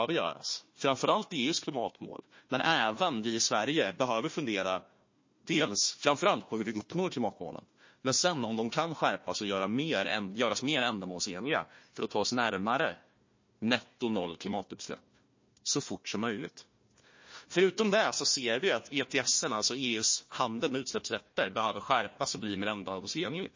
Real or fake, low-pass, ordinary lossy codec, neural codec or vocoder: fake; 7.2 kHz; MP3, 32 kbps; codec, 16 kHz, 1 kbps, FunCodec, trained on Chinese and English, 50 frames a second